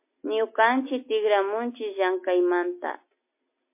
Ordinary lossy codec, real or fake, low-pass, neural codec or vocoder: MP3, 24 kbps; real; 3.6 kHz; none